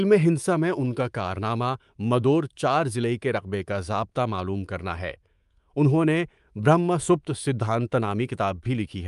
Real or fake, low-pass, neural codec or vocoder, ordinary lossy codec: fake; 10.8 kHz; codec, 24 kHz, 3.1 kbps, DualCodec; Opus, 32 kbps